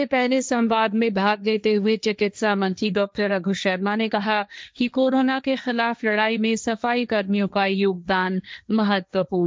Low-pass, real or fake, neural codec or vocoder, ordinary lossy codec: none; fake; codec, 16 kHz, 1.1 kbps, Voila-Tokenizer; none